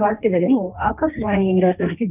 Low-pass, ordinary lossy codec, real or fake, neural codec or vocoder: 3.6 kHz; none; fake; codec, 16 kHz, 1.1 kbps, Voila-Tokenizer